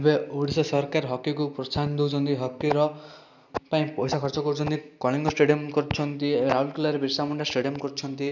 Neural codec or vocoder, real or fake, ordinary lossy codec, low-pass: none; real; none; 7.2 kHz